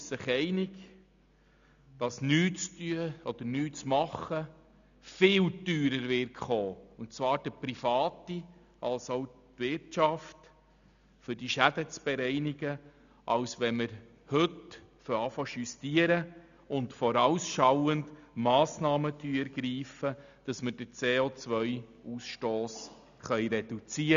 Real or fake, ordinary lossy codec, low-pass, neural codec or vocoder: real; none; 7.2 kHz; none